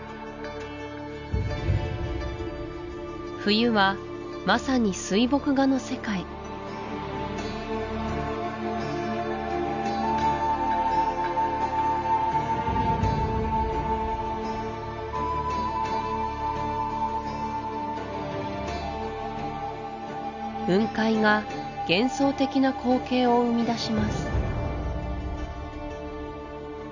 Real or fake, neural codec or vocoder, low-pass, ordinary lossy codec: real; none; 7.2 kHz; none